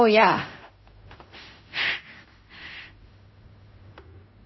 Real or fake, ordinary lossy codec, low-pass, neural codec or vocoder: fake; MP3, 24 kbps; 7.2 kHz; codec, 16 kHz in and 24 kHz out, 0.4 kbps, LongCat-Audio-Codec, fine tuned four codebook decoder